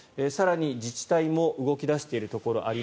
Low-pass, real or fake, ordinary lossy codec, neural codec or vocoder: none; real; none; none